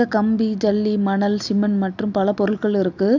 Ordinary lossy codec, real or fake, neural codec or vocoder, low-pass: none; real; none; 7.2 kHz